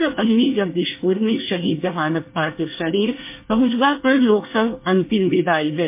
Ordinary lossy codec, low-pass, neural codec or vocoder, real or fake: MP3, 24 kbps; 3.6 kHz; codec, 24 kHz, 1 kbps, SNAC; fake